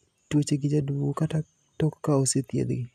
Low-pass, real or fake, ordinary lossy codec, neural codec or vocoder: 14.4 kHz; real; none; none